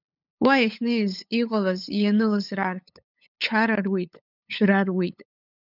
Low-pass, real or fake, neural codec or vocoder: 5.4 kHz; fake; codec, 16 kHz, 8 kbps, FunCodec, trained on LibriTTS, 25 frames a second